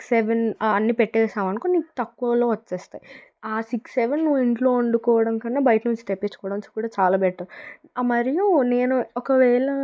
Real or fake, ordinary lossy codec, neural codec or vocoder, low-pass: real; none; none; none